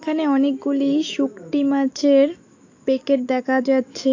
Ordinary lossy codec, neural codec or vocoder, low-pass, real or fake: AAC, 48 kbps; autoencoder, 48 kHz, 128 numbers a frame, DAC-VAE, trained on Japanese speech; 7.2 kHz; fake